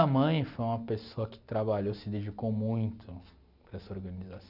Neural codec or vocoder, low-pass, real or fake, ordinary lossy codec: none; 5.4 kHz; real; none